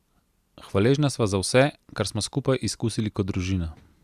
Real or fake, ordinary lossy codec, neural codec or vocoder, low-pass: real; Opus, 64 kbps; none; 14.4 kHz